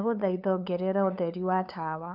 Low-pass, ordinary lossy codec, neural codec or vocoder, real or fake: 5.4 kHz; none; codec, 16 kHz, 8 kbps, FunCodec, trained on LibriTTS, 25 frames a second; fake